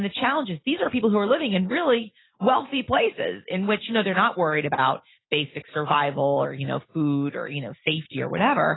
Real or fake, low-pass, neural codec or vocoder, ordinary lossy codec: real; 7.2 kHz; none; AAC, 16 kbps